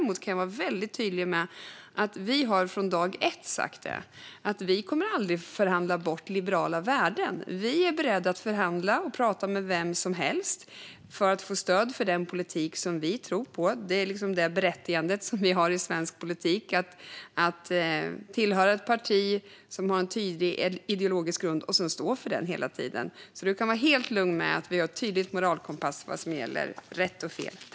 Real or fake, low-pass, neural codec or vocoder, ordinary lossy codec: real; none; none; none